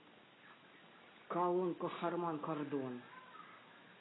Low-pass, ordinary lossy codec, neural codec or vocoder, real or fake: 7.2 kHz; AAC, 16 kbps; none; real